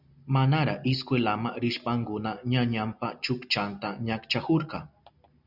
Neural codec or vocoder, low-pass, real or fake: none; 5.4 kHz; real